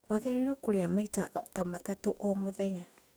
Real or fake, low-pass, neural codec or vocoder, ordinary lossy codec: fake; none; codec, 44.1 kHz, 2.6 kbps, DAC; none